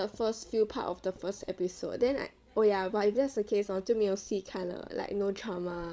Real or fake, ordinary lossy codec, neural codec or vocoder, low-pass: fake; none; codec, 16 kHz, 16 kbps, FreqCodec, larger model; none